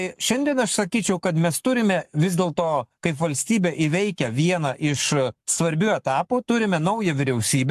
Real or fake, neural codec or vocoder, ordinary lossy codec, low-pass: fake; codec, 44.1 kHz, 7.8 kbps, Pupu-Codec; AAC, 96 kbps; 14.4 kHz